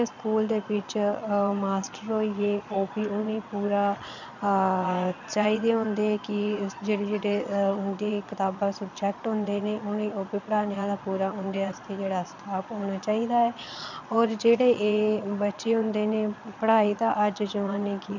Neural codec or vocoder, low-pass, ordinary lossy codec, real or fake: vocoder, 22.05 kHz, 80 mel bands, Vocos; 7.2 kHz; none; fake